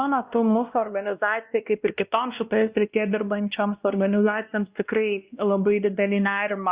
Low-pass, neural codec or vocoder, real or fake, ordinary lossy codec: 3.6 kHz; codec, 16 kHz, 1 kbps, X-Codec, WavLM features, trained on Multilingual LibriSpeech; fake; Opus, 64 kbps